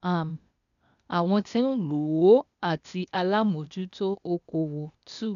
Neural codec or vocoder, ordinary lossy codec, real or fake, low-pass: codec, 16 kHz, 0.8 kbps, ZipCodec; none; fake; 7.2 kHz